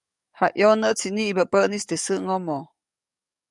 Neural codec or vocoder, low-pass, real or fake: codec, 44.1 kHz, 7.8 kbps, DAC; 10.8 kHz; fake